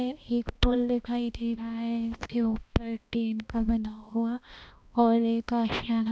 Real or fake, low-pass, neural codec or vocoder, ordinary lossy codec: fake; none; codec, 16 kHz, 1 kbps, X-Codec, HuBERT features, trained on balanced general audio; none